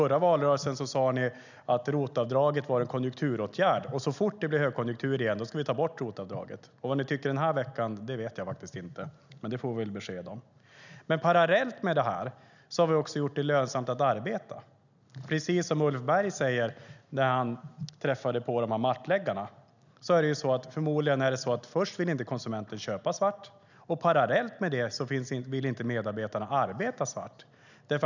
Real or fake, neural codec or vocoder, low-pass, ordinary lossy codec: real; none; 7.2 kHz; none